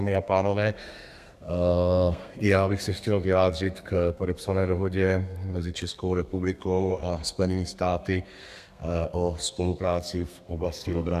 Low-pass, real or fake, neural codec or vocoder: 14.4 kHz; fake; codec, 32 kHz, 1.9 kbps, SNAC